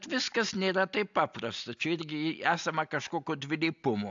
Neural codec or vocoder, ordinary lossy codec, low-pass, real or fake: none; AAC, 64 kbps; 7.2 kHz; real